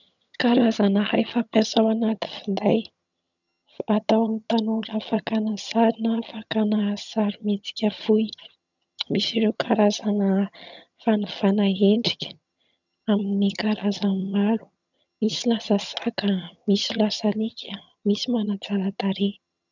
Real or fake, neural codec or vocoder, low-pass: fake; vocoder, 22.05 kHz, 80 mel bands, HiFi-GAN; 7.2 kHz